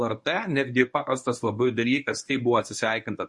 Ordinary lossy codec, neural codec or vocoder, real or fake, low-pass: MP3, 48 kbps; codec, 24 kHz, 0.9 kbps, WavTokenizer, medium speech release version 1; fake; 10.8 kHz